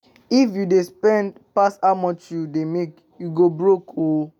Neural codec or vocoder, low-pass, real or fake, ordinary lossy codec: none; 19.8 kHz; real; none